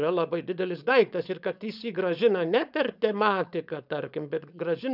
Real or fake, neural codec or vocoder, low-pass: fake; codec, 16 kHz, 4.8 kbps, FACodec; 5.4 kHz